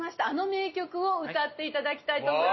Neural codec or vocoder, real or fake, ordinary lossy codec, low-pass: none; real; MP3, 24 kbps; 7.2 kHz